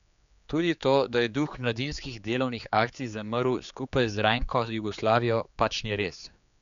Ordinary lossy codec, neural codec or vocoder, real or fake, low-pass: MP3, 96 kbps; codec, 16 kHz, 4 kbps, X-Codec, HuBERT features, trained on general audio; fake; 7.2 kHz